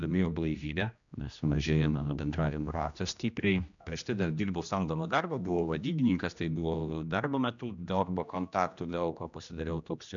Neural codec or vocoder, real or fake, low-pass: codec, 16 kHz, 1 kbps, X-Codec, HuBERT features, trained on general audio; fake; 7.2 kHz